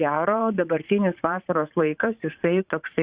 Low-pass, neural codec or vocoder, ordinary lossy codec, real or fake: 3.6 kHz; vocoder, 44.1 kHz, 80 mel bands, Vocos; Opus, 64 kbps; fake